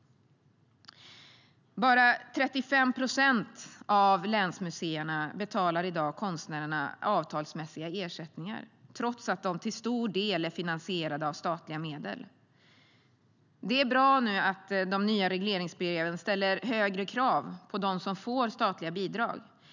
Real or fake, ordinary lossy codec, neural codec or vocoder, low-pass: real; none; none; 7.2 kHz